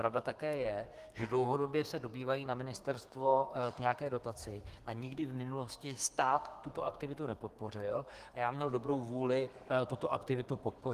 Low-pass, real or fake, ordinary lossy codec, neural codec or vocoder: 14.4 kHz; fake; Opus, 24 kbps; codec, 32 kHz, 1.9 kbps, SNAC